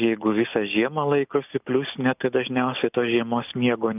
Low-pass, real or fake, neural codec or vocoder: 3.6 kHz; real; none